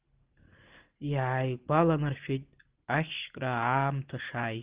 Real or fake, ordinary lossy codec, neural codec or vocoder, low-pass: real; Opus, 32 kbps; none; 3.6 kHz